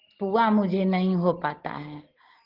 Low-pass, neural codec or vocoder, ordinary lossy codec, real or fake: 5.4 kHz; none; Opus, 16 kbps; real